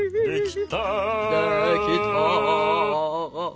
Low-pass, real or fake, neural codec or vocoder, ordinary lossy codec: none; real; none; none